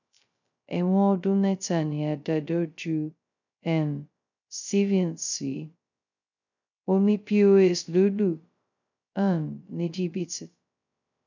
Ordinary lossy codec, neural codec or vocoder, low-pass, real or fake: none; codec, 16 kHz, 0.2 kbps, FocalCodec; 7.2 kHz; fake